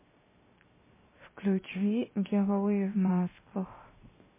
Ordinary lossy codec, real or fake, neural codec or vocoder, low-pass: MP3, 16 kbps; fake; codec, 16 kHz, 0.7 kbps, FocalCodec; 3.6 kHz